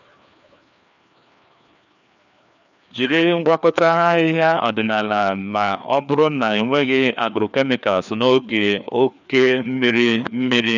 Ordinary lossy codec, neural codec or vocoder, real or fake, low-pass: none; codec, 16 kHz, 2 kbps, FreqCodec, larger model; fake; 7.2 kHz